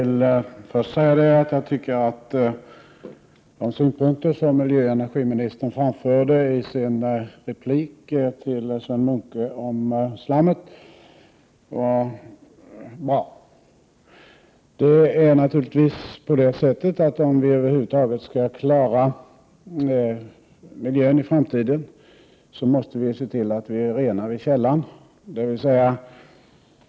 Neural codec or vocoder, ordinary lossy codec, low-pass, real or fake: none; none; none; real